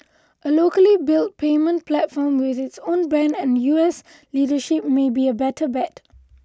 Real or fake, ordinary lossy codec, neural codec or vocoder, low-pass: real; none; none; none